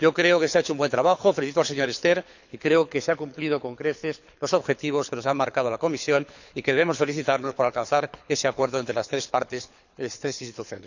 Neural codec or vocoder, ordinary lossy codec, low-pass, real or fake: codec, 24 kHz, 6 kbps, HILCodec; none; 7.2 kHz; fake